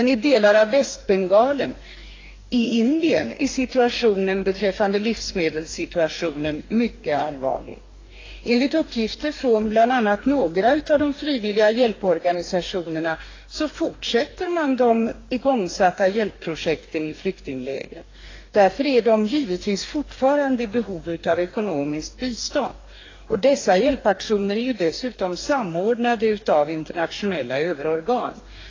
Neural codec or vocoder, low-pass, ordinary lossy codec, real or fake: codec, 44.1 kHz, 2.6 kbps, DAC; 7.2 kHz; AAC, 32 kbps; fake